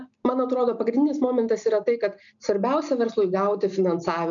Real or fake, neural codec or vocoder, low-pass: real; none; 7.2 kHz